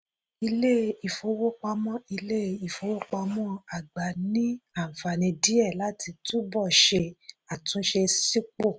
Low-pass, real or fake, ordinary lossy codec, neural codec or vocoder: none; real; none; none